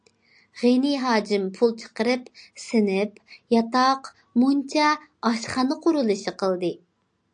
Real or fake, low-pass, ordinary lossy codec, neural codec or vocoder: real; 9.9 kHz; AAC, 64 kbps; none